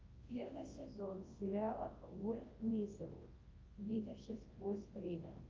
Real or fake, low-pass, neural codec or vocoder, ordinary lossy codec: fake; 7.2 kHz; codec, 24 kHz, 0.9 kbps, WavTokenizer, large speech release; Opus, 32 kbps